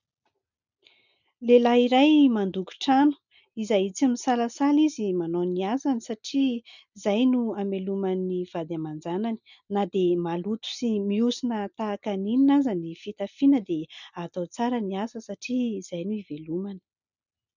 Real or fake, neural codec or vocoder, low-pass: real; none; 7.2 kHz